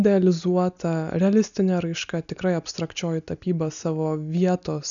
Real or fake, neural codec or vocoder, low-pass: real; none; 7.2 kHz